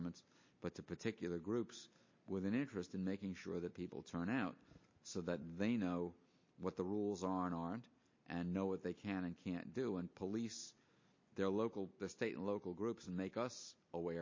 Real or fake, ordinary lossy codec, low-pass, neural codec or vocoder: real; MP3, 32 kbps; 7.2 kHz; none